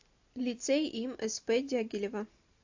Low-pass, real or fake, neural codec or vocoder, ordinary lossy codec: 7.2 kHz; real; none; AAC, 48 kbps